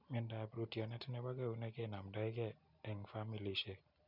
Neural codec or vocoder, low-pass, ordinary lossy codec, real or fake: none; 5.4 kHz; none; real